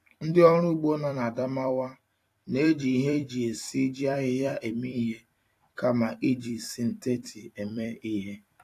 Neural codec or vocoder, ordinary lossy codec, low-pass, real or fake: vocoder, 44.1 kHz, 128 mel bands every 256 samples, BigVGAN v2; AAC, 64 kbps; 14.4 kHz; fake